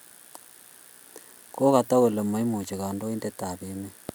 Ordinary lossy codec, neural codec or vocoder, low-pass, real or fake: none; none; none; real